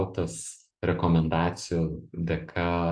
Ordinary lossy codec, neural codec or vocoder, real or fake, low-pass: AAC, 64 kbps; none; real; 9.9 kHz